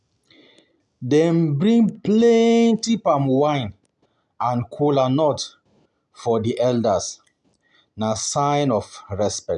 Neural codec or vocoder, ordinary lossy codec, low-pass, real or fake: none; none; 10.8 kHz; real